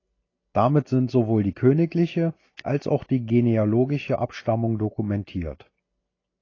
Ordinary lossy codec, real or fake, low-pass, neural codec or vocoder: AAC, 32 kbps; real; 7.2 kHz; none